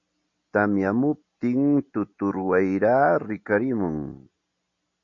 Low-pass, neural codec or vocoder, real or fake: 7.2 kHz; none; real